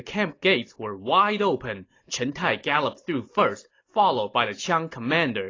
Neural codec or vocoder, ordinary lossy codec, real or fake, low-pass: none; AAC, 32 kbps; real; 7.2 kHz